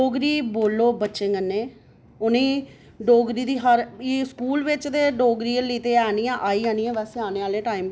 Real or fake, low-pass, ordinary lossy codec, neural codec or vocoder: real; none; none; none